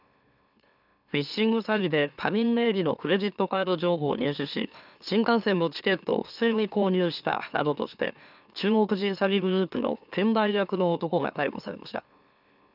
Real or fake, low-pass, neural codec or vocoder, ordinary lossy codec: fake; 5.4 kHz; autoencoder, 44.1 kHz, a latent of 192 numbers a frame, MeloTTS; none